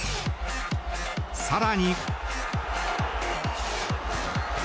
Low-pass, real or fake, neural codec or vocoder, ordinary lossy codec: none; real; none; none